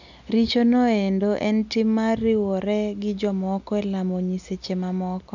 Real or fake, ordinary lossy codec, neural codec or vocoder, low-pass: real; none; none; 7.2 kHz